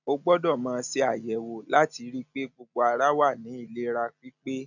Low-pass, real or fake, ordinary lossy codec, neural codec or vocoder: 7.2 kHz; real; none; none